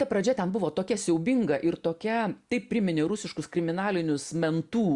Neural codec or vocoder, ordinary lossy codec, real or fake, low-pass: none; Opus, 64 kbps; real; 10.8 kHz